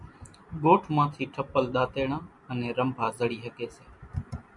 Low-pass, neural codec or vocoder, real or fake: 10.8 kHz; none; real